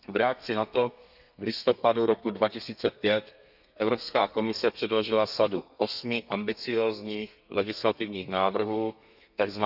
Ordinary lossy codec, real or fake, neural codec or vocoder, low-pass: none; fake; codec, 32 kHz, 1.9 kbps, SNAC; 5.4 kHz